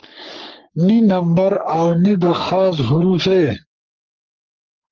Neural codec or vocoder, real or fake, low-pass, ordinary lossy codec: codec, 44.1 kHz, 3.4 kbps, Pupu-Codec; fake; 7.2 kHz; Opus, 24 kbps